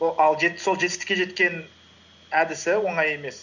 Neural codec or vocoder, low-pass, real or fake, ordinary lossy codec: none; 7.2 kHz; real; none